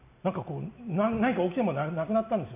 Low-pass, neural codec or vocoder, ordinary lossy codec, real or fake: 3.6 kHz; none; AAC, 32 kbps; real